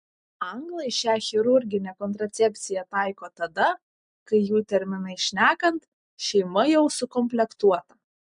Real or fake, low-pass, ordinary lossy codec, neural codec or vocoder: real; 9.9 kHz; MP3, 64 kbps; none